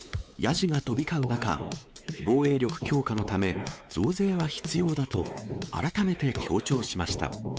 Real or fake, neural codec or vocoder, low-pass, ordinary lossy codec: fake; codec, 16 kHz, 4 kbps, X-Codec, WavLM features, trained on Multilingual LibriSpeech; none; none